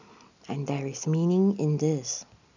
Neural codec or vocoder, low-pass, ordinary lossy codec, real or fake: none; 7.2 kHz; none; real